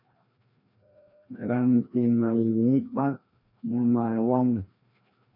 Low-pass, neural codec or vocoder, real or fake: 5.4 kHz; codec, 16 kHz, 1 kbps, FreqCodec, larger model; fake